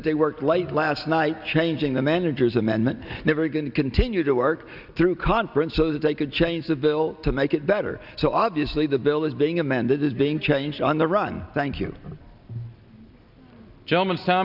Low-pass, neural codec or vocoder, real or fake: 5.4 kHz; none; real